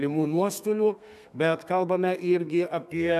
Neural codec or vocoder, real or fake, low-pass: codec, 32 kHz, 1.9 kbps, SNAC; fake; 14.4 kHz